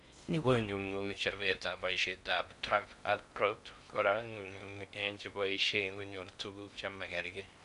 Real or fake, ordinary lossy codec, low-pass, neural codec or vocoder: fake; none; 10.8 kHz; codec, 16 kHz in and 24 kHz out, 0.6 kbps, FocalCodec, streaming, 4096 codes